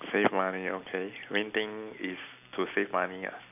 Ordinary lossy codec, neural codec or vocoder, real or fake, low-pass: none; none; real; 3.6 kHz